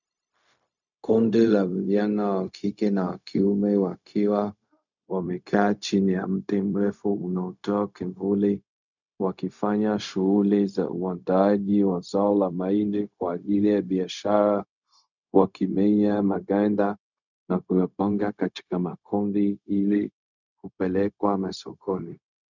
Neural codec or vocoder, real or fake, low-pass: codec, 16 kHz, 0.4 kbps, LongCat-Audio-Codec; fake; 7.2 kHz